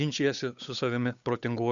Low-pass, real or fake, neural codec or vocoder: 7.2 kHz; fake; codec, 16 kHz, 8 kbps, FunCodec, trained on Chinese and English, 25 frames a second